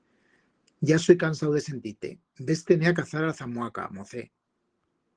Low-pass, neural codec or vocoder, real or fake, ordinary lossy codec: 9.9 kHz; none; real; Opus, 16 kbps